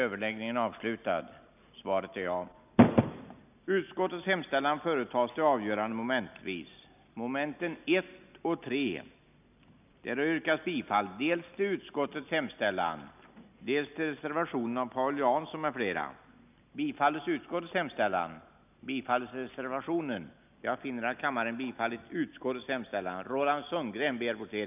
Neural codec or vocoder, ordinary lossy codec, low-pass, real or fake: none; none; 3.6 kHz; real